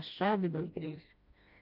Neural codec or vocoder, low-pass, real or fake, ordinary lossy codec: codec, 16 kHz, 1 kbps, FreqCodec, smaller model; 5.4 kHz; fake; AAC, 48 kbps